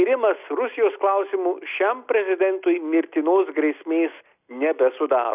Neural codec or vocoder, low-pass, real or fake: none; 3.6 kHz; real